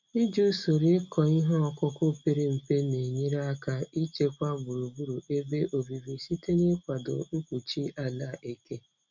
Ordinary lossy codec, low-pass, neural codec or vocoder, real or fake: none; 7.2 kHz; none; real